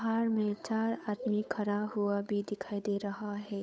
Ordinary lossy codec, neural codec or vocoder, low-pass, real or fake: none; codec, 16 kHz, 8 kbps, FunCodec, trained on Chinese and English, 25 frames a second; none; fake